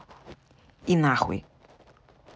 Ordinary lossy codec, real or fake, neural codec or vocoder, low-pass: none; real; none; none